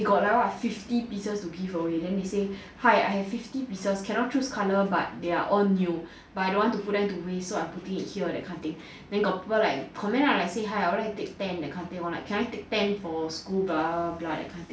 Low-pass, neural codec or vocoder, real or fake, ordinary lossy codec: none; none; real; none